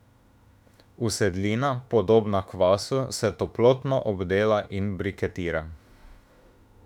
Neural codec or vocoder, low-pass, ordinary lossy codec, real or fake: autoencoder, 48 kHz, 32 numbers a frame, DAC-VAE, trained on Japanese speech; 19.8 kHz; none; fake